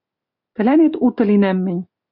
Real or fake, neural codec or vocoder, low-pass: real; none; 5.4 kHz